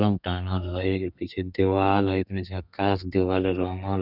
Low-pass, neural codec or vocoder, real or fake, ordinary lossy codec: 5.4 kHz; codec, 32 kHz, 1.9 kbps, SNAC; fake; none